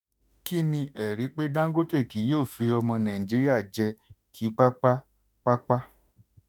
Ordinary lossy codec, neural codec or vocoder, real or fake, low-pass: none; autoencoder, 48 kHz, 32 numbers a frame, DAC-VAE, trained on Japanese speech; fake; none